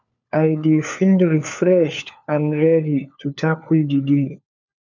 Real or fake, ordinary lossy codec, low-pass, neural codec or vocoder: fake; none; 7.2 kHz; codec, 16 kHz, 4 kbps, FunCodec, trained on LibriTTS, 50 frames a second